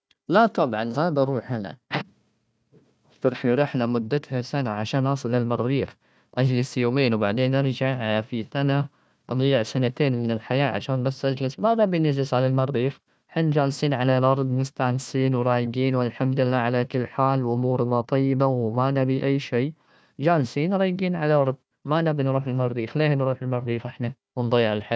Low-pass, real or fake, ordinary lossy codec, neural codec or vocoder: none; fake; none; codec, 16 kHz, 1 kbps, FunCodec, trained on Chinese and English, 50 frames a second